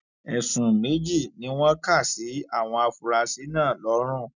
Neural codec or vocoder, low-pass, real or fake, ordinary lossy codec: none; 7.2 kHz; real; none